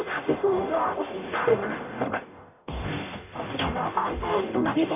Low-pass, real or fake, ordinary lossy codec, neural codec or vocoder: 3.6 kHz; fake; none; codec, 44.1 kHz, 0.9 kbps, DAC